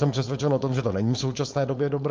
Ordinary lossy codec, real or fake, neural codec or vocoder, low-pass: Opus, 24 kbps; fake; codec, 16 kHz, 4.8 kbps, FACodec; 7.2 kHz